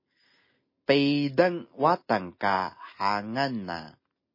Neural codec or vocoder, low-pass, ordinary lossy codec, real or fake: none; 5.4 kHz; MP3, 24 kbps; real